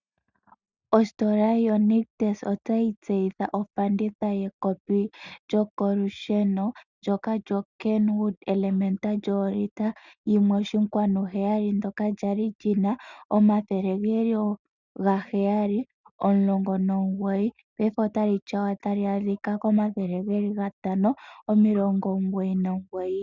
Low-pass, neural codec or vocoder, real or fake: 7.2 kHz; none; real